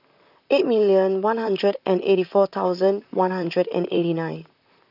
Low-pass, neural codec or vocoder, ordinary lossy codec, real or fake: 5.4 kHz; vocoder, 44.1 kHz, 128 mel bands, Pupu-Vocoder; none; fake